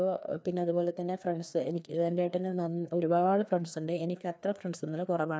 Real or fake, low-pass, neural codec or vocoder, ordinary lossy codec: fake; none; codec, 16 kHz, 2 kbps, FreqCodec, larger model; none